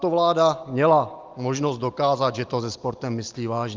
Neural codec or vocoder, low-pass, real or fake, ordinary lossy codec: none; 7.2 kHz; real; Opus, 32 kbps